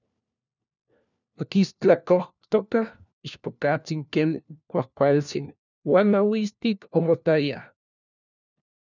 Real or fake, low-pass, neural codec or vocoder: fake; 7.2 kHz; codec, 16 kHz, 1 kbps, FunCodec, trained on LibriTTS, 50 frames a second